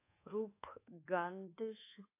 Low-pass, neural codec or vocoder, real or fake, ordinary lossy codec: 3.6 kHz; codec, 24 kHz, 1.2 kbps, DualCodec; fake; AAC, 24 kbps